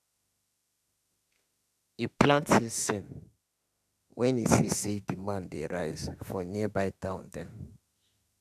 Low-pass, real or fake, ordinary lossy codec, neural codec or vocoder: 14.4 kHz; fake; AAC, 96 kbps; autoencoder, 48 kHz, 32 numbers a frame, DAC-VAE, trained on Japanese speech